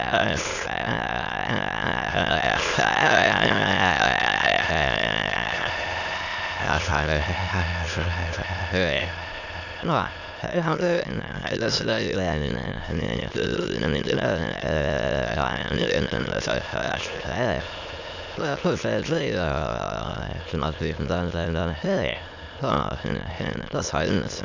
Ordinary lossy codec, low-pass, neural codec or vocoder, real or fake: none; 7.2 kHz; autoencoder, 22.05 kHz, a latent of 192 numbers a frame, VITS, trained on many speakers; fake